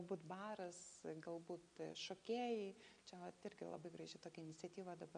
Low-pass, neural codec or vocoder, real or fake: 9.9 kHz; none; real